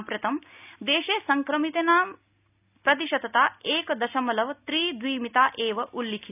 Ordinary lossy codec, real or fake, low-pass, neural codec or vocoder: none; real; 3.6 kHz; none